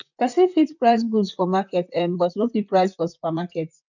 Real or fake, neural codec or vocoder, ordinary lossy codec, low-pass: fake; vocoder, 44.1 kHz, 128 mel bands, Pupu-Vocoder; none; 7.2 kHz